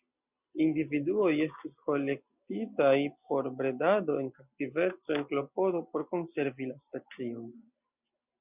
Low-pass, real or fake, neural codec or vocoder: 3.6 kHz; real; none